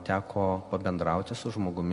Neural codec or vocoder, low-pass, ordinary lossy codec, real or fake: none; 10.8 kHz; MP3, 48 kbps; real